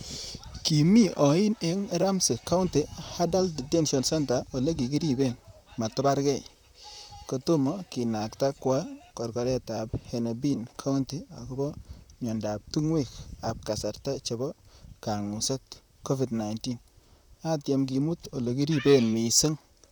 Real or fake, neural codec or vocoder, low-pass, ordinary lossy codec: fake; vocoder, 44.1 kHz, 128 mel bands, Pupu-Vocoder; none; none